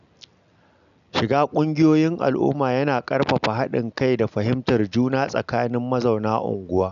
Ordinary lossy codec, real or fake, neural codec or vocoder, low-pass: none; real; none; 7.2 kHz